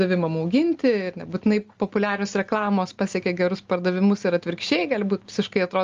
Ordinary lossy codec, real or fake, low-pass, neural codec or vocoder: Opus, 32 kbps; real; 7.2 kHz; none